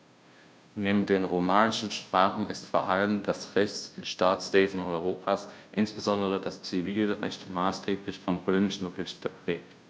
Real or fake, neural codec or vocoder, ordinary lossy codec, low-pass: fake; codec, 16 kHz, 0.5 kbps, FunCodec, trained on Chinese and English, 25 frames a second; none; none